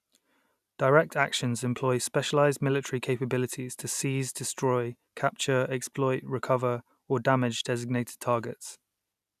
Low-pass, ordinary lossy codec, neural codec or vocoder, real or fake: 14.4 kHz; none; none; real